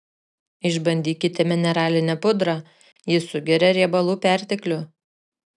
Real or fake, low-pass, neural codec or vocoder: real; 10.8 kHz; none